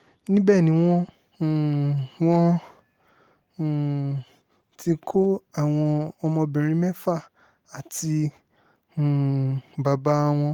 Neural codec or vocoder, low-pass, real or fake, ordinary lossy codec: none; 19.8 kHz; real; Opus, 24 kbps